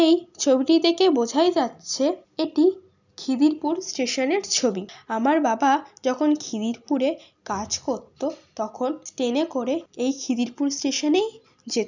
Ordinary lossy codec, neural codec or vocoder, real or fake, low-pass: none; none; real; 7.2 kHz